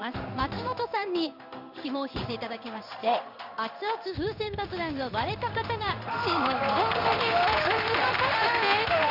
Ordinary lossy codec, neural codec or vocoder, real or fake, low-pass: none; codec, 16 kHz in and 24 kHz out, 1 kbps, XY-Tokenizer; fake; 5.4 kHz